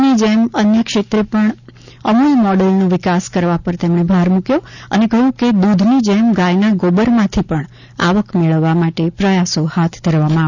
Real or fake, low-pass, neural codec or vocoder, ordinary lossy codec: real; 7.2 kHz; none; none